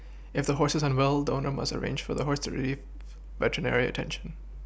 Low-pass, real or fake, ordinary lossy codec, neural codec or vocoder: none; real; none; none